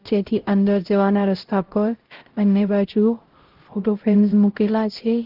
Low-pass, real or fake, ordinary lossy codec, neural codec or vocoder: 5.4 kHz; fake; Opus, 16 kbps; codec, 16 kHz, 0.5 kbps, X-Codec, HuBERT features, trained on LibriSpeech